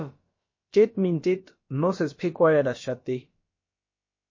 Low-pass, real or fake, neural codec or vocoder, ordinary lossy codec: 7.2 kHz; fake; codec, 16 kHz, about 1 kbps, DyCAST, with the encoder's durations; MP3, 32 kbps